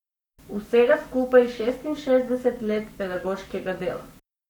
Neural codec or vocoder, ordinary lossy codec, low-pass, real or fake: codec, 44.1 kHz, 7.8 kbps, Pupu-Codec; none; 19.8 kHz; fake